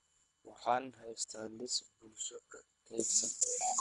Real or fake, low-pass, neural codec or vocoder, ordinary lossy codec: fake; 10.8 kHz; codec, 24 kHz, 3 kbps, HILCodec; none